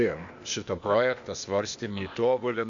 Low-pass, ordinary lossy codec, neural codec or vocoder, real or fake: 7.2 kHz; MP3, 64 kbps; codec, 16 kHz, 0.8 kbps, ZipCodec; fake